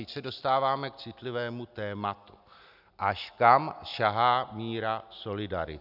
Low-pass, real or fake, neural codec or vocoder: 5.4 kHz; real; none